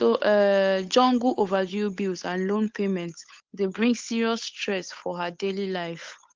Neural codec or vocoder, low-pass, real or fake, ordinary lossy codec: codec, 16 kHz, 8 kbps, FunCodec, trained on Chinese and English, 25 frames a second; 7.2 kHz; fake; Opus, 32 kbps